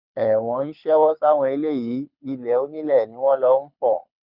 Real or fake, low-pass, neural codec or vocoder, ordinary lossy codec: fake; 5.4 kHz; codec, 24 kHz, 6 kbps, HILCodec; none